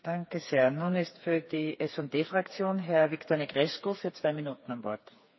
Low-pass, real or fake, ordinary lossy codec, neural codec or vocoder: 7.2 kHz; fake; MP3, 24 kbps; codec, 16 kHz, 4 kbps, FreqCodec, smaller model